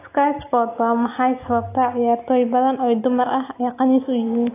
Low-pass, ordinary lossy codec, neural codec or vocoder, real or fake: 3.6 kHz; AAC, 24 kbps; none; real